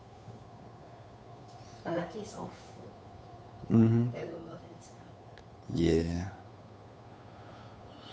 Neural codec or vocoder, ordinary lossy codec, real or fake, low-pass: codec, 16 kHz, 2 kbps, FunCodec, trained on Chinese and English, 25 frames a second; none; fake; none